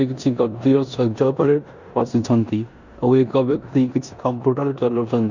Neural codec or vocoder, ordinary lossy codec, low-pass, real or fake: codec, 16 kHz in and 24 kHz out, 0.9 kbps, LongCat-Audio-Codec, four codebook decoder; none; 7.2 kHz; fake